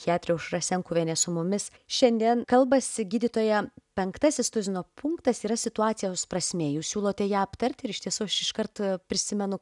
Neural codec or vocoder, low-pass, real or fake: none; 10.8 kHz; real